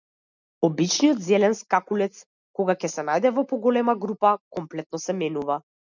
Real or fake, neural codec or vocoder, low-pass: real; none; 7.2 kHz